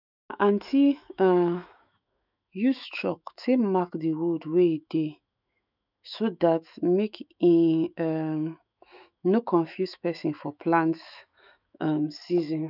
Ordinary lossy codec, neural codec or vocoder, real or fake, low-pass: none; autoencoder, 48 kHz, 128 numbers a frame, DAC-VAE, trained on Japanese speech; fake; 5.4 kHz